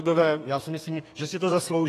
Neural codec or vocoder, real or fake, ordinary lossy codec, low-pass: codec, 32 kHz, 1.9 kbps, SNAC; fake; AAC, 48 kbps; 14.4 kHz